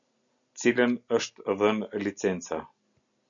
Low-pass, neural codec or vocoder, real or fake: 7.2 kHz; none; real